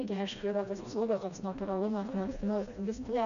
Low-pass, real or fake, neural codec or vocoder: 7.2 kHz; fake; codec, 16 kHz, 1 kbps, FreqCodec, smaller model